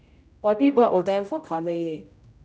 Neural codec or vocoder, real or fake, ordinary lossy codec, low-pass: codec, 16 kHz, 0.5 kbps, X-Codec, HuBERT features, trained on general audio; fake; none; none